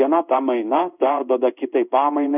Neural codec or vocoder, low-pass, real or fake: codec, 16 kHz in and 24 kHz out, 1 kbps, XY-Tokenizer; 3.6 kHz; fake